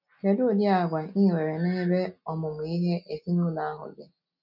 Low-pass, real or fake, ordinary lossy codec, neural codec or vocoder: 5.4 kHz; real; none; none